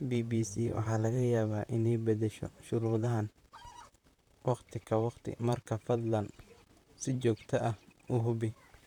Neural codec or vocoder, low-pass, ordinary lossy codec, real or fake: vocoder, 44.1 kHz, 128 mel bands, Pupu-Vocoder; 19.8 kHz; none; fake